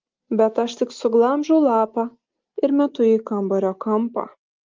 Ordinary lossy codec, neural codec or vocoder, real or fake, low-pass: Opus, 32 kbps; none; real; 7.2 kHz